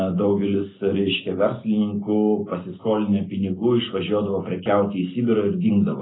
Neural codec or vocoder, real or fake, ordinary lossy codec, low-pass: none; real; AAC, 16 kbps; 7.2 kHz